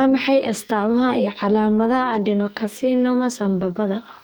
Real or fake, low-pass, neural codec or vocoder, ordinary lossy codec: fake; none; codec, 44.1 kHz, 2.6 kbps, SNAC; none